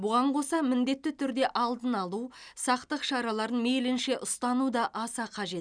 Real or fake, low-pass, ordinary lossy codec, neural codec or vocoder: real; 9.9 kHz; none; none